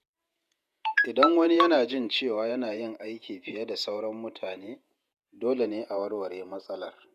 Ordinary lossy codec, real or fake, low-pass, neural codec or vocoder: none; fake; 14.4 kHz; vocoder, 48 kHz, 128 mel bands, Vocos